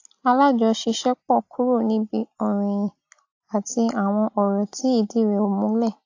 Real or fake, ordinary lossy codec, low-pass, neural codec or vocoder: real; AAC, 48 kbps; 7.2 kHz; none